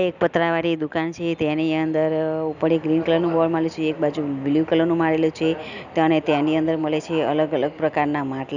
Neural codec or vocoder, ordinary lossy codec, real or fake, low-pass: none; none; real; 7.2 kHz